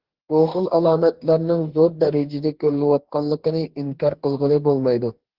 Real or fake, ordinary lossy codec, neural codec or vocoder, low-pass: fake; Opus, 16 kbps; codec, 44.1 kHz, 2.6 kbps, DAC; 5.4 kHz